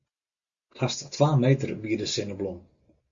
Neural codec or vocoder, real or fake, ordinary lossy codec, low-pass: none; real; Opus, 64 kbps; 7.2 kHz